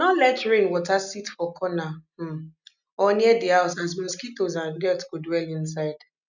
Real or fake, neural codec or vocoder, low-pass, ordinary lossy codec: real; none; 7.2 kHz; none